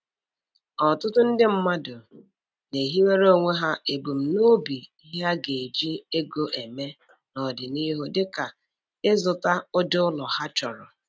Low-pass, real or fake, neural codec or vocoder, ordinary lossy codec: none; real; none; none